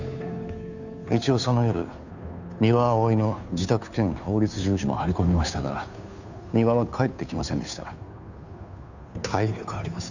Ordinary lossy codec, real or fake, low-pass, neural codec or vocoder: none; fake; 7.2 kHz; codec, 16 kHz, 2 kbps, FunCodec, trained on Chinese and English, 25 frames a second